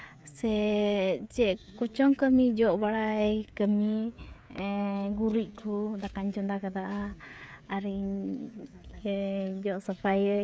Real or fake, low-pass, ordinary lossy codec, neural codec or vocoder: fake; none; none; codec, 16 kHz, 16 kbps, FreqCodec, smaller model